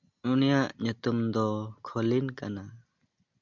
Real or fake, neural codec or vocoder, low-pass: real; none; 7.2 kHz